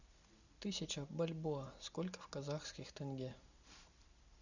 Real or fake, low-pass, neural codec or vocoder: real; 7.2 kHz; none